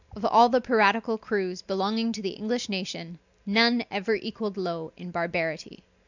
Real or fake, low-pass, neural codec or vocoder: real; 7.2 kHz; none